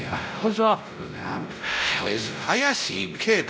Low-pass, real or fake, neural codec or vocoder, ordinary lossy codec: none; fake; codec, 16 kHz, 0.5 kbps, X-Codec, WavLM features, trained on Multilingual LibriSpeech; none